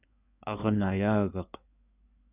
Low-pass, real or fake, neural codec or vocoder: 3.6 kHz; fake; codec, 16 kHz in and 24 kHz out, 2.2 kbps, FireRedTTS-2 codec